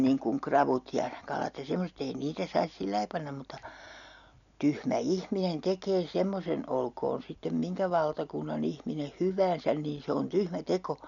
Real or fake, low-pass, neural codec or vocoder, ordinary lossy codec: real; 7.2 kHz; none; none